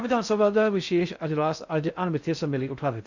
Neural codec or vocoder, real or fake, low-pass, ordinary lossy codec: codec, 16 kHz in and 24 kHz out, 0.6 kbps, FocalCodec, streaming, 4096 codes; fake; 7.2 kHz; none